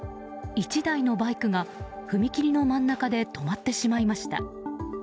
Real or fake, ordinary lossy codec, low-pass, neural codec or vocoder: real; none; none; none